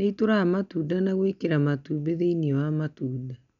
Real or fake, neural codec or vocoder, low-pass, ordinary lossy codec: real; none; 7.2 kHz; none